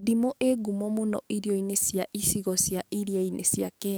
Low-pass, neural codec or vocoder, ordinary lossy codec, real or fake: none; codec, 44.1 kHz, 7.8 kbps, DAC; none; fake